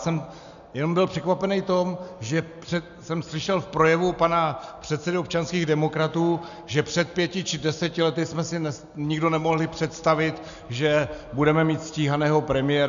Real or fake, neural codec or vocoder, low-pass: real; none; 7.2 kHz